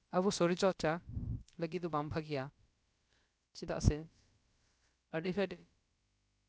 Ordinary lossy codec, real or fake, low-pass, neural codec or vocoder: none; fake; none; codec, 16 kHz, about 1 kbps, DyCAST, with the encoder's durations